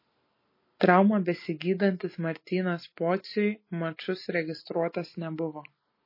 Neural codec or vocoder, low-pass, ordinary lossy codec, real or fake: none; 5.4 kHz; MP3, 24 kbps; real